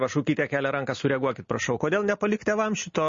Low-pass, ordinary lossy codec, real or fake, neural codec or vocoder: 7.2 kHz; MP3, 32 kbps; real; none